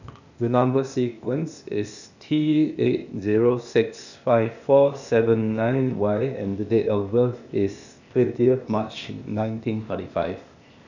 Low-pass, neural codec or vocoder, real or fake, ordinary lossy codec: 7.2 kHz; codec, 16 kHz, 0.8 kbps, ZipCodec; fake; none